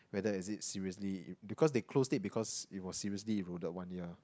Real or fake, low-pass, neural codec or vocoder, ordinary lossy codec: real; none; none; none